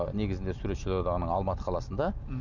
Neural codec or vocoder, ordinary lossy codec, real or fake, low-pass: vocoder, 44.1 kHz, 128 mel bands every 256 samples, BigVGAN v2; none; fake; 7.2 kHz